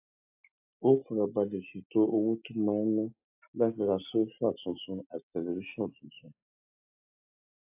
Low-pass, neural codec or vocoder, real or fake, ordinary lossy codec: 3.6 kHz; none; real; AAC, 32 kbps